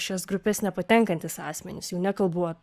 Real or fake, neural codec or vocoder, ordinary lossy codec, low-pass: fake; codec, 44.1 kHz, 7.8 kbps, Pupu-Codec; Opus, 64 kbps; 14.4 kHz